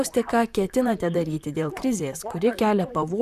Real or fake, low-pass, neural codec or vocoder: fake; 14.4 kHz; vocoder, 44.1 kHz, 128 mel bands, Pupu-Vocoder